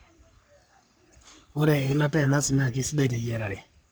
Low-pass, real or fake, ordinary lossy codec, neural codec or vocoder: none; fake; none; codec, 44.1 kHz, 3.4 kbps, Pupu-Codec